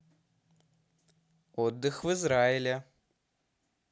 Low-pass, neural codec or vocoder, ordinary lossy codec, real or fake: none; none; none; real